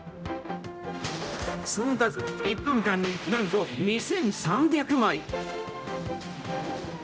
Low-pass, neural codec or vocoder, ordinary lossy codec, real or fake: none; codec, 16 kHz, 0.5 kbps, X-Codec, HuBERT features, trained on general audio; none; fake